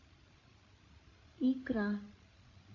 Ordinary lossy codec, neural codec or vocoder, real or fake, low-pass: AAC, 32 kbps; codec, 16 kHz, 16 kbps, FreqCodec, larger model; fake; 7.2 kHz